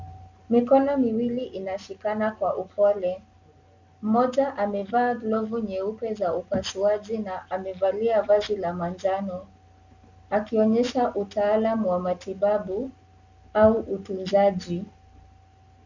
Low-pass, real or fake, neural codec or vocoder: 7.2 kHz; real; none